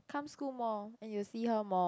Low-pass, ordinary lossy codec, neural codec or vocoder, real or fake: none; none; none; real